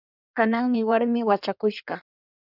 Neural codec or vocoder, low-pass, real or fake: codec, 16 kHz in and 24 kHz out, 1.1 kbps, FireRedTTS-2 codec; 5.4 kHz; fake